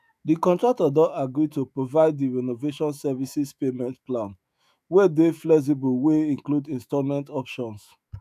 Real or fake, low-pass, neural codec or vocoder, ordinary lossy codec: fake; 14.4 kHz; autoencoder, 48 kHz, 128 numbers a frame, DAC-VAE, trained on Japanese speech; none